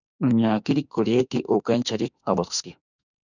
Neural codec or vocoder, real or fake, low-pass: autoencoder, 48 kHz, 32 numbers a frame, DAC-VAE, trained on Japanese speech; fake; 7.2 kHz